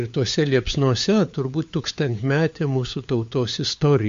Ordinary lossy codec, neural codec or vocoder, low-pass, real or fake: MP3, 48 kbps; codec, 16 kHz, 4 kbps, FunCodec, trained on LibriTTS, 50 frames a second; 7.2 kHz; fake